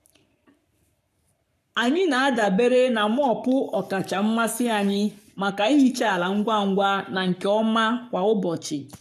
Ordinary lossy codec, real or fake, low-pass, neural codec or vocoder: none; fake; 14.4 kHz; codec, 44.1 kHz, 7.8 kbps, Pupu-Codec